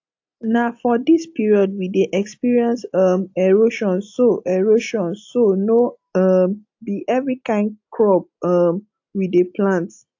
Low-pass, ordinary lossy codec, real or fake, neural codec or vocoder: 7.2 kHz; AAC, 48 kbps; real; none